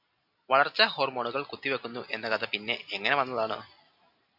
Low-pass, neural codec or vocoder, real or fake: 5.4 kHz; none; real